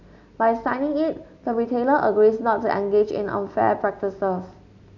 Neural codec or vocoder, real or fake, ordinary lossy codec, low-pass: none; real; none; 7.2 kHz